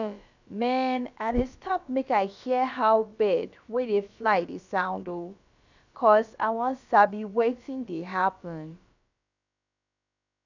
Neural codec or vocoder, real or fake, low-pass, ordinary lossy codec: codec, 16 kHz, about 1 kbps, DyCAST, with the encoder's durations; fake; 7.2 kHz; none